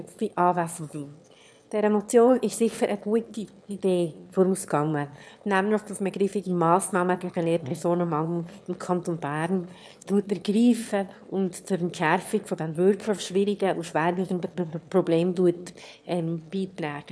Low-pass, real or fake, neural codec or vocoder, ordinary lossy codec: none; fake; autoencoder, 22.05 kHz, a latent of 192 numbers a frame, VITS, trained on one speaker; none